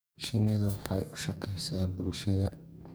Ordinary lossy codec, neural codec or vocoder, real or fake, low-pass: none; codec, 44.1 kHz, 2.6 kbps, DAC; fake; none